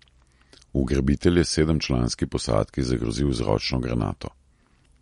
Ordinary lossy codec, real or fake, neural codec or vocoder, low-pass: MP3, 48 kbps; real; none; 19.8 kHz